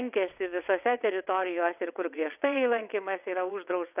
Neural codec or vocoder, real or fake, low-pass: vocoder, 22.05 kHz, 80 mel bands, WaveNeXt; fake; 3.6 kHz